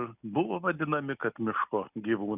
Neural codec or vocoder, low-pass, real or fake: none; 3.6 kHz; real